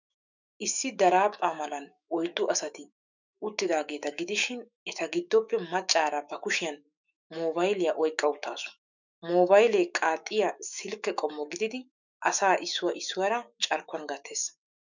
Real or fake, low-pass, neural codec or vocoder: fake; 7.2 kHz; autoencoder, 48 kHz, 128 numbers a frame, DAC-VAE, trained on Japanese speech